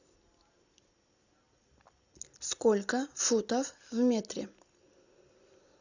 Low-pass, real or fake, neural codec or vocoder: 7.2 kHz; real; none